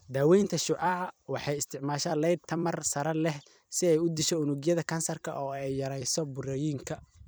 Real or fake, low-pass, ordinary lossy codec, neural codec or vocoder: fake; none; none; vocoder, 44.1 kHz, 128 mel bands every 256 samples, BigVGAN v2